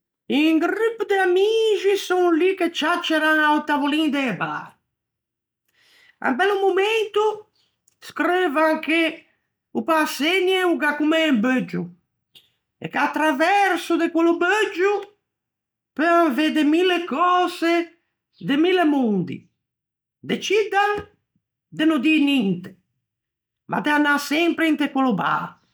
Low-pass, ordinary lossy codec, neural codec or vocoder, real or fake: none; none; none; real